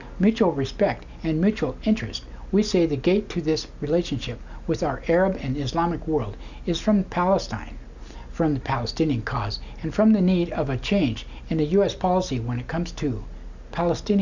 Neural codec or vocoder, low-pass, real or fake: none; 7.2 kHz; real